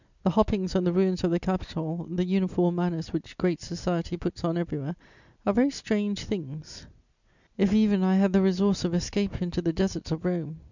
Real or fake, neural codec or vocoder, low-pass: real; none; 7.2 kHz